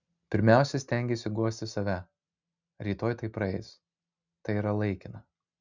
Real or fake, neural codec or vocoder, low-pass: real; none; 7.2 kHz